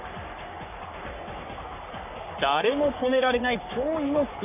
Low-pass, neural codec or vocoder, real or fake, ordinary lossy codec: 3.6 kHz; codec, 44.1 kHz, 3.4 kbps, Pupu-Codec; fake; none